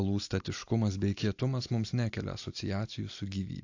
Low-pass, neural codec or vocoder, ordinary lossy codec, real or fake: 7.2 kHz; none; AAC, 48 kbps; real